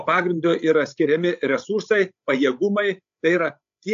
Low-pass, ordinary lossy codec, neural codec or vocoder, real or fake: 7.2 kHz; AAC, 64 kbps; codec, 16 kHz, 16 kbps, FreqCodec, larger model; fake